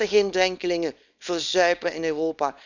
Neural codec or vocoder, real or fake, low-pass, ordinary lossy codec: codec, 24 kHz, 0.9 kbps, WavTokenizer, small release; fake; 7.2 kHz; none